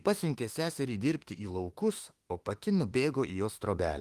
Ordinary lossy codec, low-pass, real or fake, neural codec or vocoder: Opus, 24 kbps; 14.4 kHz; fake; autoencoder, 48 kHz, 32 numbers a frame, DAC-VAE, trained on Japanese speech